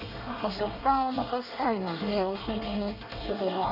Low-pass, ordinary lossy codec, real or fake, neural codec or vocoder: 5.4 kHz; none; fake; codec, 24 kHz, 1 kbps, SNAC